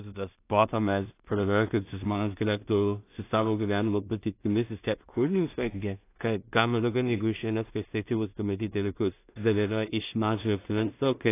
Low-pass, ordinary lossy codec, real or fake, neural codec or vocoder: 3.6 kHz; AAC, 24 kbps; fake; codec, 16 kHz in and 24 kHz out, 0.4 kbps, LongCat-Audio-Codec, two codebook decoder